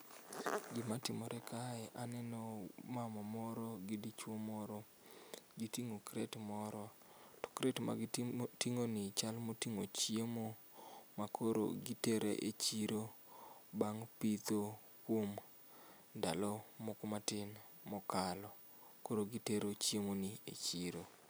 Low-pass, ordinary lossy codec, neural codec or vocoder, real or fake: none; none; none; real